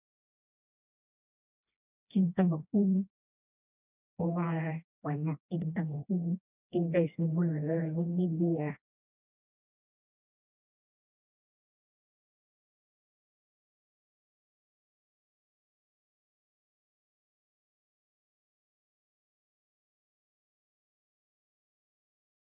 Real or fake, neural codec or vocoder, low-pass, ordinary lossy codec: fake; codec, 16 kHz, 1 kbps, FreqCodec, smaller model; 3.6 kHz; none